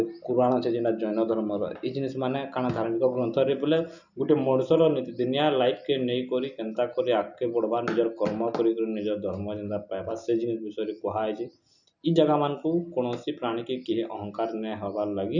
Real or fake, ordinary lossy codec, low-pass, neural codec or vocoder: real; none; 7.2 kHz; none